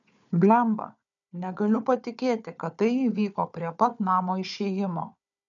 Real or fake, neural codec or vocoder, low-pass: fake; codec, 16 kHz, 4 kbps, FunCodec, trained on Chinese and English, 50 frames a second; 7.2 kHz